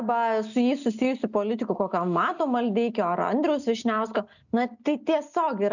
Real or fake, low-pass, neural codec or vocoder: real; 7.2 kHz; none